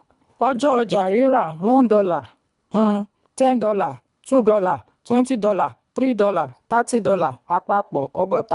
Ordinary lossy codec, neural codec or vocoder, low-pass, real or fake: none; codec, 24 kHz, 1.5 kbps, HILCodec; 10.8 kHz; fake